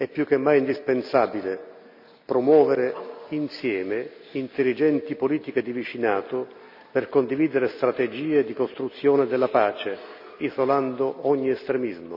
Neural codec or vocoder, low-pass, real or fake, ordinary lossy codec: none; 5.4 kHz; real; none